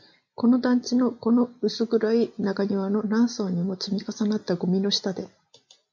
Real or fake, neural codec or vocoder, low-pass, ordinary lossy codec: real; none; 7.2 kHz; MP3, 64 kbps